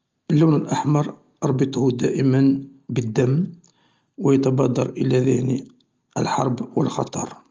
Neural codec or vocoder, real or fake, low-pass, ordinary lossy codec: none; real; 7.2 kHz; Opus, 32 kbps